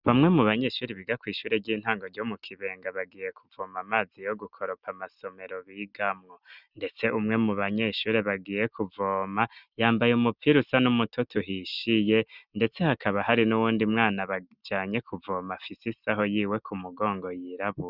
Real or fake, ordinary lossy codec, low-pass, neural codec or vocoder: real; Opus, 64 kbps; 5.4 kHz; none